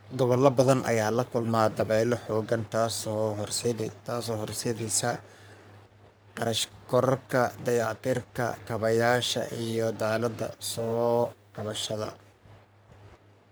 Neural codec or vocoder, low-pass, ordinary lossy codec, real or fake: codec, 44.1 kHz, 3.4 kbps, Pupu-Codec; none; none; fake